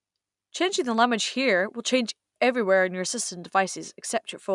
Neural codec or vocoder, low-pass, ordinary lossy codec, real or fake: none; 10.8 kHz; none; real